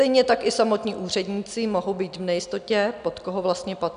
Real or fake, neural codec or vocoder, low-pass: real; none; 9.9 kHz